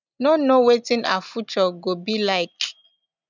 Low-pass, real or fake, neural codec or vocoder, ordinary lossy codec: 7.2 kHz; real; none; none